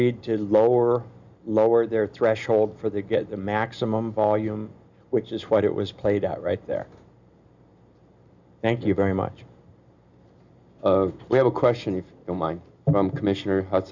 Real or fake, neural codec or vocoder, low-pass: real; none; 7.2 kHz